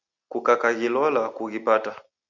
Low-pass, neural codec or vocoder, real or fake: 7.2 kHz; none; real